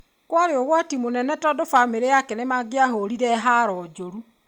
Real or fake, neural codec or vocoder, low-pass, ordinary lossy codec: real; none; 19.8 kHz; Opus, 64 kbps